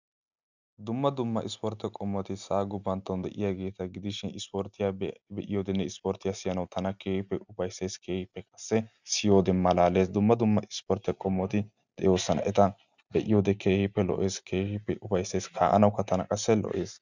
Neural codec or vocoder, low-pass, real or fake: none; 7.2 kHz; real